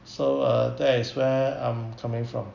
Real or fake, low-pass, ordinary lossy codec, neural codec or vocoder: real; 7.2 kHz; none; none